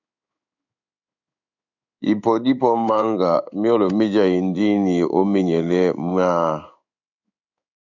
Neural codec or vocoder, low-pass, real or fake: codec, 16 kHz in and 24 kHz out, 1 kbps, XY-Tokenizer; 7.2 kHz; fake